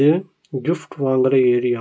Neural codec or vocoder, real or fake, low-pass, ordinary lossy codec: none; real; none; none